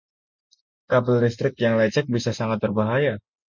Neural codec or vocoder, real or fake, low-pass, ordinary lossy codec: none; real; 7.2 kHz; MP3, 64 kbps